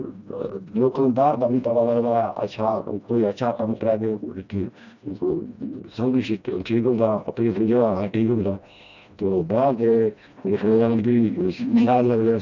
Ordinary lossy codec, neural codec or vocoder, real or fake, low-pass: none; codec, 16 kHz, 1 kbps, FreqCodec, smaller model; fake; 7.2 kHz